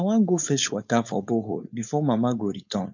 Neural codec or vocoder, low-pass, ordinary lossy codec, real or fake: codec, 16 kHz, 4.8 kbps, FACodec; 7.2 kHz; none; fake